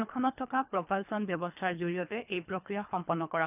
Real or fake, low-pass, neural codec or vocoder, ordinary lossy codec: fake; 3.6 kHz; codec, 24 kHz, 3 kbps, HILCodec; none